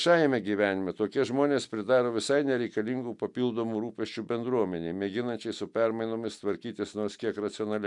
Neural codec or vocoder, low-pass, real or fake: none; 10.8 kHz; real